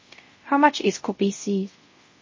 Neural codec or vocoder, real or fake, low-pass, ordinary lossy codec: codec, 24 kHz, 0.5 kbps, DualCodec; fake; 7.2 kHz; MP3, 32 kbps